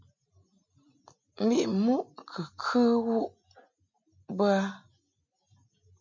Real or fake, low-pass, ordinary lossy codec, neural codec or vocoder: real; 7.2 kHz; MP3, 48 kbps; none